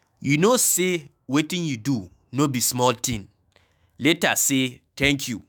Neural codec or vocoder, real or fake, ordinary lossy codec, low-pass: autoencoder, 48 kHz, 128 numbers a frame, DAC-VAE, trained on Japanese speech; fake; none; none